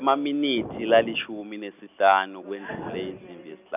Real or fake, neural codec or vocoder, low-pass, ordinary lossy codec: real; none; 3.6 kHz; none